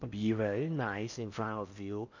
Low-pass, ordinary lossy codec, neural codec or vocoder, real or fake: 7.2 kHz; none; codec, 16 kHz in and 24 kHz out, 0.6 kbps, FocalCodec, streaming, 4096 codes; fake